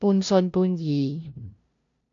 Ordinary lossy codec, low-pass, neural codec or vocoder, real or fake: AAC, 64 kbps; 7.2 kHz; codec, 16 kHz, 0.5 kbps, FunCodec, trained on LibriTTS, 25 frames a second; fake